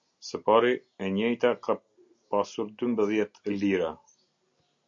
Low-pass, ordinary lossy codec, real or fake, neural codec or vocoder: 7.2 kHz; MP3, 48 kbps; real; none